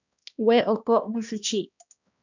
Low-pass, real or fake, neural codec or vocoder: 7.2 kHz; fake; codec, 16 kHz, 1 kbps, X-Codec, HuBERT features, trained on balanced general audio